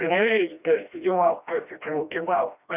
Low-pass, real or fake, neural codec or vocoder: 3.6 kHz; fake; codec, 16 kHz, 1 kbps, FreqCodec, smaller model